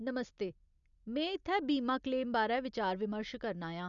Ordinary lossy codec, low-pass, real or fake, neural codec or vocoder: none; 7.2 kHz; real; none